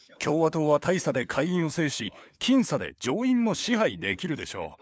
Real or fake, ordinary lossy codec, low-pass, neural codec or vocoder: fake; none; none; codec, 16 kHz, 4 kbps, FunCodec, trained on LibriTTS, 50 frames a second